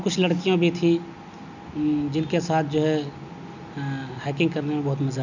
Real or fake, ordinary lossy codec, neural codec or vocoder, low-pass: real; none; none; 7.2 kHz